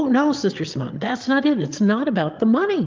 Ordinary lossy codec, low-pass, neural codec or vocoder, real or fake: Opus, 24 kbps; 7.2 kHz; codec, 16 kHz, 8 kbps, FreqCodec, larger model; fake